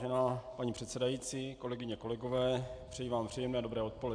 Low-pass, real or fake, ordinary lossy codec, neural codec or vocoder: 9.9 kHz; real; AAC, 64 kbps; none